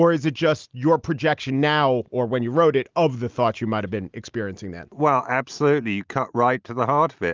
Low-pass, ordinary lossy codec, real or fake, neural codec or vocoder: 7.2 kHz; Opus, 32 kbps; real; none